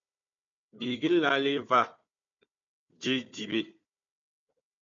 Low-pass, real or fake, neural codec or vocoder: 7.2 kHz; fake; codec, 16 kHz, 4 kbps, FunCodec, trained on Chinese and English, 50 frames a second